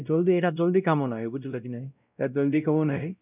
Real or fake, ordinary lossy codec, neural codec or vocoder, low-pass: fake; none; codec, 16 kHz, 0.5 kbps, X-Codec, WavLM features, trained on Multilingual LibriSpeech; 3.6 kHz